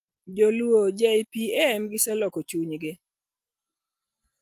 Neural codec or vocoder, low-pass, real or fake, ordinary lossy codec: none; 14.4 kHz; real; Opus, 32 kbps